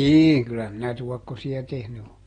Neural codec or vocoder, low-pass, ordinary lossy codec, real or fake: none; 9.9 kHz; AAC, 32 kbps; real